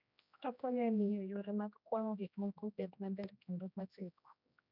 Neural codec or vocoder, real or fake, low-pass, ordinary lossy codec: codec, 16 kHz, 1 kbps, X-Codec, HuBERT features, trained on general audio; fake; 5.4 kHz; none